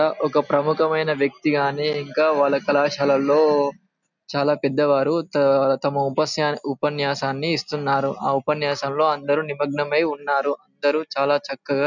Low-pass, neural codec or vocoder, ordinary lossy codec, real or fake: 7.2 kHz; none; none; real